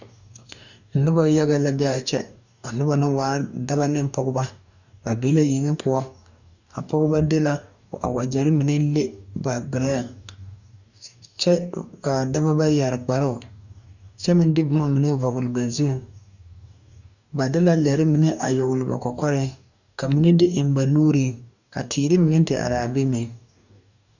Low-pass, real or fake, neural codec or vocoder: 7.2 kHz; fake; codec, 44.1 kHz, 2.6 kbps, DAC